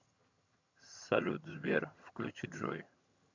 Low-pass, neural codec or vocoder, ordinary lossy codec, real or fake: 7.2 kHz; vocoder, 22.05 kHz, 80 mel bands, HiFi-GAN; MP3, 64 kbps; fake